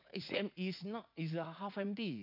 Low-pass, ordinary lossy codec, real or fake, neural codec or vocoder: 5.4 kHz; none; fake; vocoder, 44.1 kHz, 80 mel bands, Vocos